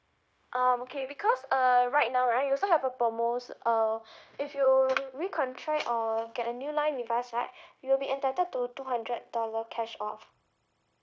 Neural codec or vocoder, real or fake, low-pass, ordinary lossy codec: codec, 16 kHz, 0.9 kbps, LongCat-Audio-Codec; fake; none; none